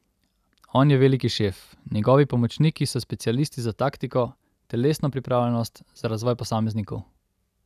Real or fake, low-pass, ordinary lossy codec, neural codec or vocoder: real; 14.4 kHz; none; none